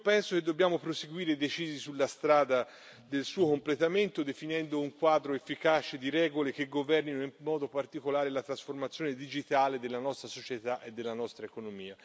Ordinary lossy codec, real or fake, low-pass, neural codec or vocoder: none; real; none; none